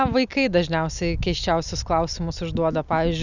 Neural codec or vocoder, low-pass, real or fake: none; 7.2 kHz; real